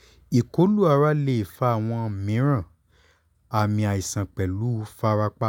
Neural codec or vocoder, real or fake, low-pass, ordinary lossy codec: none; real; 19.8 kHz; none